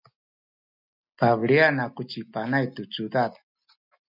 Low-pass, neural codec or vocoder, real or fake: 5.4 kHz; none; real